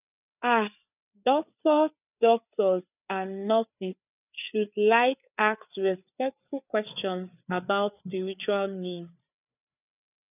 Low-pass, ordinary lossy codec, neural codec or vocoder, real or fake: 3.6 kHz; none; codec, 16 kHz, 4 kbps, FreqCodec, larger model; fake